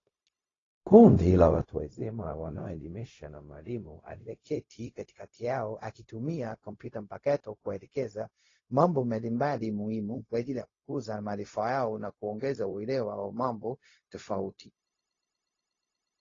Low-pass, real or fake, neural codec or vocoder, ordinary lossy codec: 7.2 kHz; fake; codec, 16 kHz, 0.4 kbps, LongCat-Audio-Codec; AAC, 32 kbps